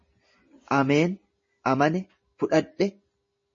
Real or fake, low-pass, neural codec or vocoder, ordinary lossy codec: real; 7.2 kHz; none; MP3, 32 kbps